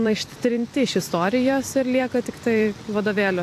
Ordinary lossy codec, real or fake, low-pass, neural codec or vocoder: AAC, 64 kbps; fake; 14.4 kHz; vocoder, 44.1 kHz, 128 mel bands every 256 samples, BigVGAN v2